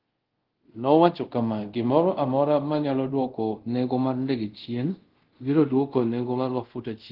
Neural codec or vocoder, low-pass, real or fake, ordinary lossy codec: codec, 24 kHz, 0.5 kbps, DualCodec; 5.4 kHz; fake; Opus, 16 kbps